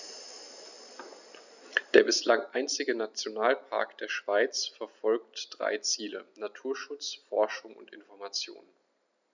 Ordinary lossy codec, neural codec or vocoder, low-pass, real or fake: none; none; 7.2 kHz; real